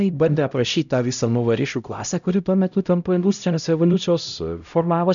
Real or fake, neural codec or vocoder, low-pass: fake; codec, 16 kHz, 0.5 kbps, X-Codec, HuBERT features, trained on LibriSpeech; 7.2 kHz